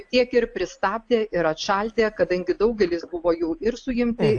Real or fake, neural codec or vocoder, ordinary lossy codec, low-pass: real; none; AAC, 64 kbps; 9.9 kHz